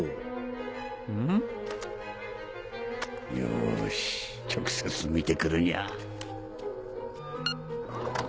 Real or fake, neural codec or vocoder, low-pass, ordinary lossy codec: real; none; none; none